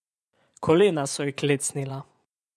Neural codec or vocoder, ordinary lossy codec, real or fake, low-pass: vocoder, 24 kHz, 100 mel bands, Vocos; none; fake; none